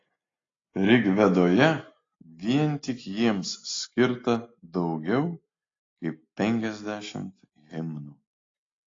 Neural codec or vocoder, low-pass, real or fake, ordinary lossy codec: none; 7.2 kHz; real; AAC, 32 kbps